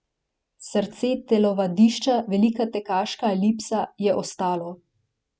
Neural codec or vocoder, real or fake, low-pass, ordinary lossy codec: none; real; none; none